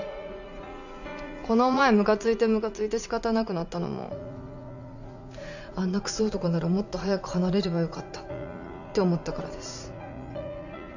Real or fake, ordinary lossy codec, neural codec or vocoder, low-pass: fake; none; vocoder, 44.1 kHz, 80 mel bands, Vocos; 7.2 kHz